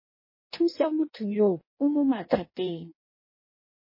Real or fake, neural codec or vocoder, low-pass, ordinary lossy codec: fake; codec, 16 kHz in and 24 kHz out, 0.6 kbps, FireRedTTS-2 codec; 5.4 kHz; MP3, 24 kbps